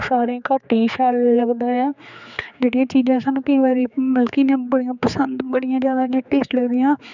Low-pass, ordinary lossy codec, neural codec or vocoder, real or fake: 7.2 kHz; none; codec, 16 kHz, 4 kbps, X-Codec, HuBERT features, trained on general audio; fake